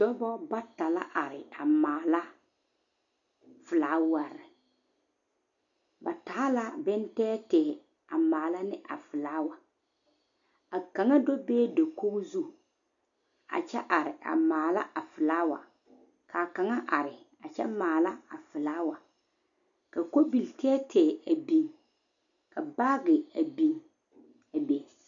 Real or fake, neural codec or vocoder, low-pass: real; none; 7.2 kHz